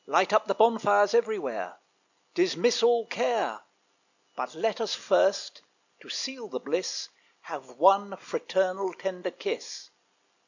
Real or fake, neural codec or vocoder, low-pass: real; none; 7.2 kHz